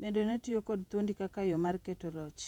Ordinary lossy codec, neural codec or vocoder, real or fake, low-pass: none; vocoder, 48 kHz, 128 mel bands, Vocos; fake; 19.8 kHz